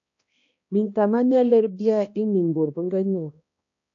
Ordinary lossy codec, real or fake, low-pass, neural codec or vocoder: AAC, 48 kbps; fake; 7.2 kHz; codec, 16 kHz, 1 kbps, X-Codec, HuBERT features, trained on balanced general audio